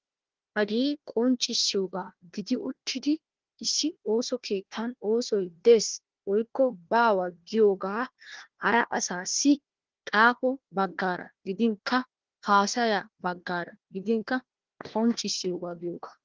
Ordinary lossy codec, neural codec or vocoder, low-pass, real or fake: Opus, 16 kbps; codec, 16 kHz, 1 kbps, FunCodec, trained on Chinese and English, 50 frames a second; 7.2 kHz; fake